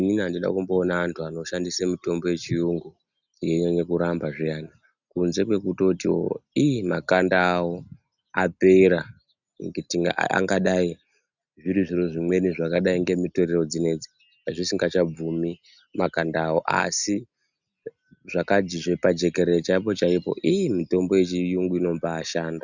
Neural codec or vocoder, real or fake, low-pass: none; real; 7.2 kHz